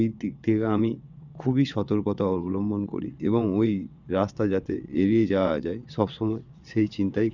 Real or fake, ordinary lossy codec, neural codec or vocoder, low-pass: fake; none; vocoder, 22.05 kHz, 80 mel bands, WaveNeXt; 7.2 kHz